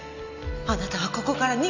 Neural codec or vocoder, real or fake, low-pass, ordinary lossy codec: none; real; 7.2 kHz; none